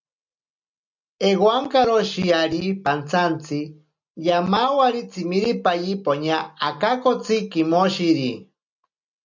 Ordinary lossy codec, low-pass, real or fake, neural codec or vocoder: MP3, 64 kbps; 7.2 kHz; real; none